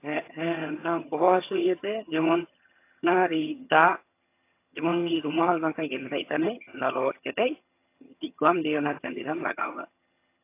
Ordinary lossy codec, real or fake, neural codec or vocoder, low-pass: AAC, 24 kbps; fake; vocoder, 22.05 kHz, 80 mel bands, HiFi-GAN; 3.6 kHz